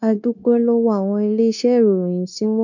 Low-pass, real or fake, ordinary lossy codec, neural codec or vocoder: 7.2 kHz; fake; none; codec, 16 kHz, 0.9 kbps, LongCat-Audio-Codec